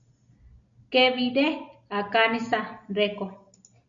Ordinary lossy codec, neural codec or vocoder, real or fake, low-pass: MP3, 64 kbps; none; real; 7.2 kHz